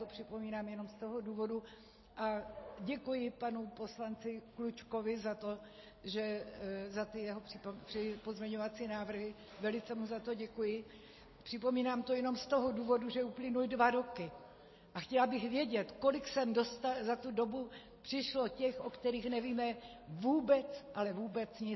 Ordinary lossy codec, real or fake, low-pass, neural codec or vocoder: MP3, 24 kbps; real; 7.2 kHz; none